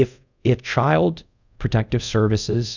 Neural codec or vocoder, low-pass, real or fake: codec, 24 kHz, 0.5 kbps, DualCodec; 7.2 kHz; fake